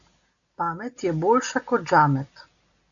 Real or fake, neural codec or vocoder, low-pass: real; none; 7.2 kHz